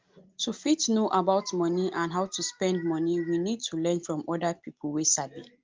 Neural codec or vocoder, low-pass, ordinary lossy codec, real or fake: none; 7.2 kHz; Opus, 32 kbps; real